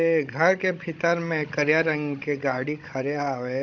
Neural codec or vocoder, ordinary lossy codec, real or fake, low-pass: codec, 16 kHz, 16 kbps, FunCodec, trained on Chinese and English, 50 frames a second; none; fake; 7.2 kHz